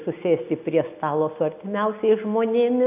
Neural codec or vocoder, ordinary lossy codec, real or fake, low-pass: none; AAC, 32 kbps; real; 3.6 kHz